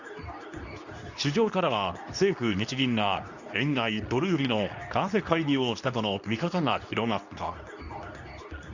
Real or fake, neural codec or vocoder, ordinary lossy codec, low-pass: fake; codec, 24 kHz, 0.9 kbps, WavTokenizer, medium speech release version 2; none; 7.2 kHz